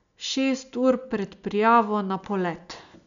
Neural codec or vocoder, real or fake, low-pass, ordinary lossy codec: none; real; 7.2 kHz; none